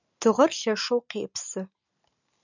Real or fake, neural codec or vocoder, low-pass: real; none; 7.2 kHz